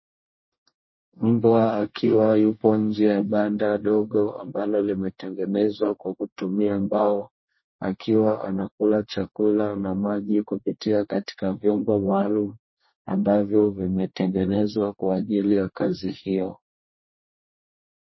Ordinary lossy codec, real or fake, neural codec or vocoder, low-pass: MP3, 24 kbps; fake; codec, 24 kHz, 1 kbps, SNAC; 7.2 kHz